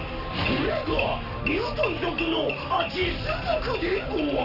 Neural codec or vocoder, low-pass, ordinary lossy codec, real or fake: codec, 44.1 kHz, 7.8 kbps, Pupu-Codec; 5.4 kHz; none; fake